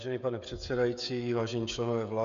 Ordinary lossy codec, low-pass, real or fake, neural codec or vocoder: MP3, 64 kbps; 7.2 kHz; fake; codec, 16 kHz, 16 kbps, FreqCodec, smaller model